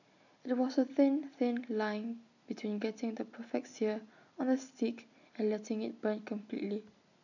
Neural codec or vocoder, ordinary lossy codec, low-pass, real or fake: none; none; 7.2 kHz; real